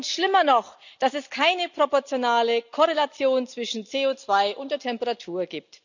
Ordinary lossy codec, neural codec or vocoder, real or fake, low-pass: none; none; real; 7.2 kHz